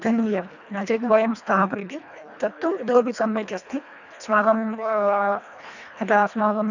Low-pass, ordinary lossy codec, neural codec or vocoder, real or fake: 7.2 kHz; none; codec, 24 kHz, 1.5 kbps, HILCodec; fake